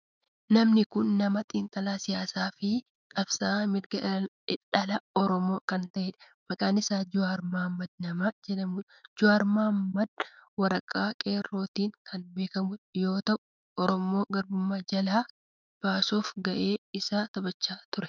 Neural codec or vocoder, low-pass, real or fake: autoencoder, 48 kHz, 128 numbers a frame, DAC-VAE, trained on Japanese speech; 7.2 kHz; fake